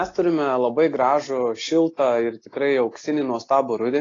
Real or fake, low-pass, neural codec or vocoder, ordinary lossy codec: real; 7.2 kHz; none; AAC, 32 kbps